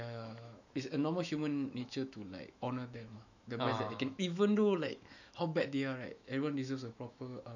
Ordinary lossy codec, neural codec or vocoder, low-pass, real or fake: none; autoencoder, 48 kHz, 128 numbers a frame, DAC-VAE, trained on Japanese speech; 7.2 kHz; fake